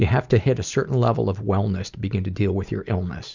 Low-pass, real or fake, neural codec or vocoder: 7.2 kHz; fake; vocoder, 44.1 kHz, 128 mel bands every 256 samples, BigVGAN v2